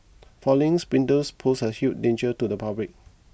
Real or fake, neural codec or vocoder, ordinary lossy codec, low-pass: real; none; none; none